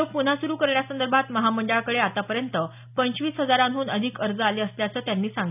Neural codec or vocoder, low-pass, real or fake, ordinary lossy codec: none; 3.6 kHz; real; none